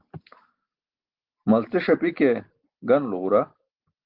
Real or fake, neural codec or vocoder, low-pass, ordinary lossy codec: real; none; 5.4 kHz; Opus, 16 kbps